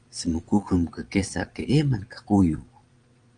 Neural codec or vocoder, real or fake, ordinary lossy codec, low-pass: vocoder, 22.05 kHz, 80 mel bands, Vocos; fake; Opus, 32 kbps; 9.9 kHz